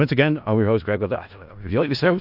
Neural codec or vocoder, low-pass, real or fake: codec, 16 kHz in and 24 kHz out, 0.4 kbps, LongCat-Audio-Codec, four codebook decoder; 5.4 kHz; fake